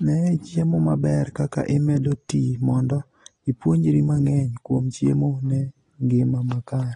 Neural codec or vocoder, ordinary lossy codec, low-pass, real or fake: none; AAC, 32 kbps; 10.8 kHz; real